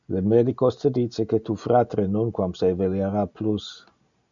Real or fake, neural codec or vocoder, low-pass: real; none; 7.2 kHz